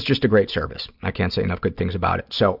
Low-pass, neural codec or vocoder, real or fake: 5.4 kHz; none; real